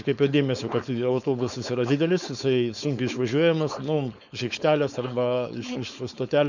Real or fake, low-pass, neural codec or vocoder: fake; 7.2 kHz; codec, 16 kHz, 4.8 kbps, FACodec